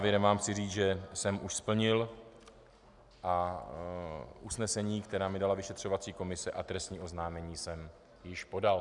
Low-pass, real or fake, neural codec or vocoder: 10.8 kHz; real; none